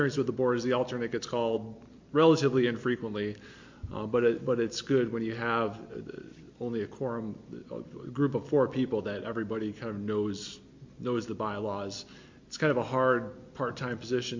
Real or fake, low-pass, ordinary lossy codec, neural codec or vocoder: real; 7.2 kHz; MP3, 48 kbps; none